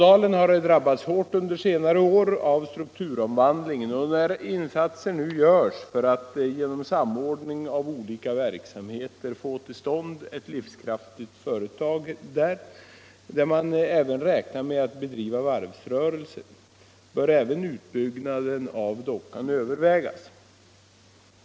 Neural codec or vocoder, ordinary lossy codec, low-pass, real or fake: none; none; none; real